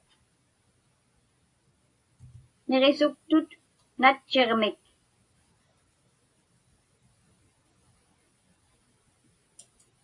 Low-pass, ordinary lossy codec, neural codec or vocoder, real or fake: 10.8 kHz; AAC, 48 kbps; none; real